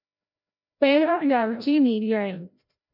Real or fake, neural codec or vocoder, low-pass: fake; codec, 16 kHz, 0.5 kbps, FreqCodec, larger model; 5.4 kHz